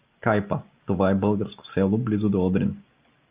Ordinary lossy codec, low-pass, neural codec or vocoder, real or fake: Opus, 24 kbps; 3.6 kHz; none; real